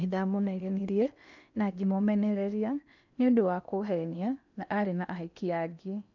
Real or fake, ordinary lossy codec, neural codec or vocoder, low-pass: fake; none; codec, 16 kHz in and 24 kHz out, 0.8 kbps, FocalCodec, streaming, 65536 codes; 7.2 kHz